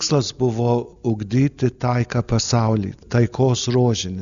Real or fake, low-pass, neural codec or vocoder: real; 7.2 kHz; none